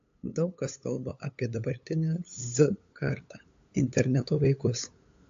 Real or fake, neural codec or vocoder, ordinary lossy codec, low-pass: fake; codec, 16 kHz, 8 kbps, FunCodec, trained on LibriTTS, 25 frames a second; AAC, 64 kbps; 7.2 kHz